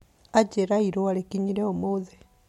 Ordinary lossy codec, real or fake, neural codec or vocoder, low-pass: MP3, 64 kbps; real; none; 19.8 kHz